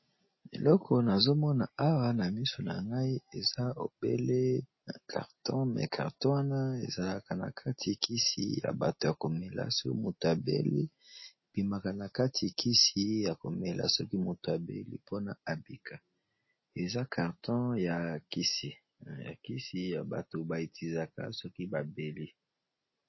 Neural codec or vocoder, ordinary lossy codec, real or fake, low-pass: none; MP3, 24 kbps; real; 7.2 kHz